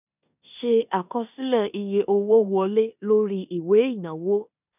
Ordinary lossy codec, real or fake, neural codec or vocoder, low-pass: none; fake; codec, 16 kHz in and 24 kHz out, 0.9 kbps, LongCat-Audio-Codec, four codebook decoder; 3.6 kHz